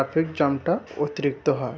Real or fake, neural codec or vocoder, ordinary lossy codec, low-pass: real; none; none; none